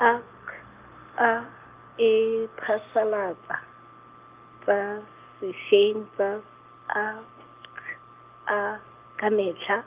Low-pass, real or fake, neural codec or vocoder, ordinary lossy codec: 3.6 kHz; real; none; Opus, 32 kbps